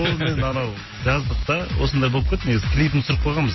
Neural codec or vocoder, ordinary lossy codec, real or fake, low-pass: none; MP3, 24 kbps; real; 7.2 kHz